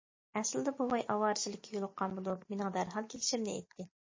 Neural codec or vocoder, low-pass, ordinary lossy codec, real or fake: none; 7.2 kHz; MP3, 32 kbps; real